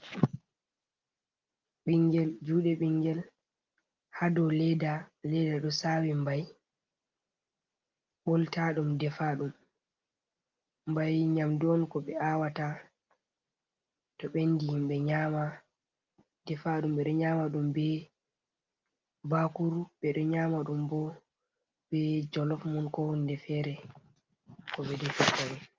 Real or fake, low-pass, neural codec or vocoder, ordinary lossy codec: real; 7.2 kHz; none; Opus, 32 kbps